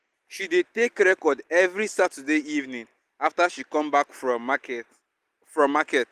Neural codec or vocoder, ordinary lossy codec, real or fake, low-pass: none; Opus, 24 kbps; real; 14.4 kHz